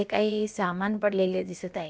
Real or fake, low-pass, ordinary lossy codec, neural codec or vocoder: fake; none; none; codec, 16 kHz, about 1 kbps, DyCAST, with the encoder's durations